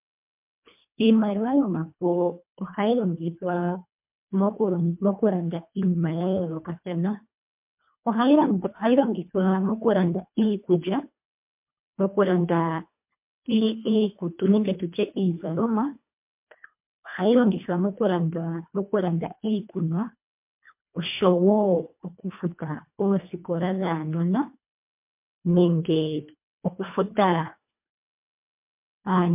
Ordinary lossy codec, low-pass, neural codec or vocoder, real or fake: MP3, 32 kbps; 3.6 kHz; codec, 24 kHz, 1.5 kbps, HILCodec; fake